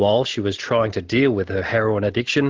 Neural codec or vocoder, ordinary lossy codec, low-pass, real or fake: none; Opus, 16 kbps; 7.2 kHz; real